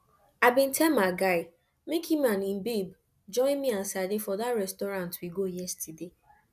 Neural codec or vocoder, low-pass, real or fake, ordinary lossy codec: none; 14.4 kHz; real; none